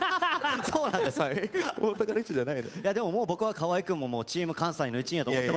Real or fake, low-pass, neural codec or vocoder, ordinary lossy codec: fake; none; codec, 16 kHz, 8 kbps, FunCodec, trained on Chinese and English, 25 frames a second; none